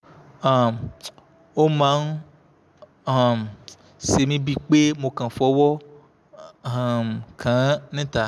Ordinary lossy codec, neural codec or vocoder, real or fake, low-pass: none; none; real; none